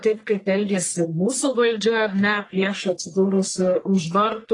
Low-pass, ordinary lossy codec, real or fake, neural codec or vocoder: 10.8 kHz; AAC, 32 kbps; fake; codec, 44.1 kHz, 1.7 kbps, Pupu-Codec